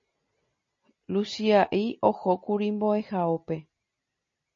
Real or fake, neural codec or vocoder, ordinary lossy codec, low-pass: real; none; MP3, 32 kbps; 7.2 kHz